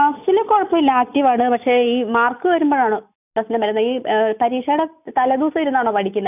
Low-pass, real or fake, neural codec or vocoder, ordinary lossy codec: 3.6 kHz; real; none; none